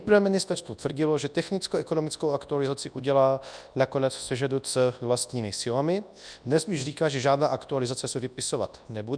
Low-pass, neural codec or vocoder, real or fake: 9.9 kHz; codec, 24 kHz, 0.9 kbps, WavTokenizer, large speech release; fake